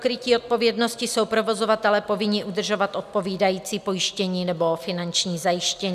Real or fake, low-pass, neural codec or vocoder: real; 14.4 kHz; none